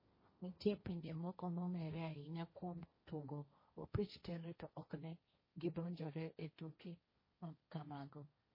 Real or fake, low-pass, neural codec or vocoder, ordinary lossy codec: fake; 5.4 kHz; codec, 16 kHz, 1.1 kbps, Voila-Tokenizer; MP3, 24 kbps